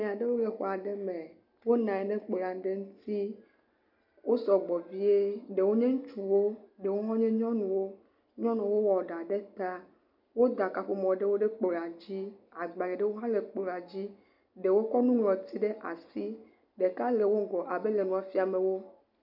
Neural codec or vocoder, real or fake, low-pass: none; real; 5.4 kHz